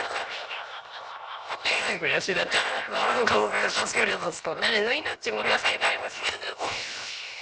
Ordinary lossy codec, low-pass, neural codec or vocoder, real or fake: none; none; codec, 16 kHz, 0.7 kbps, FocalCodec; fake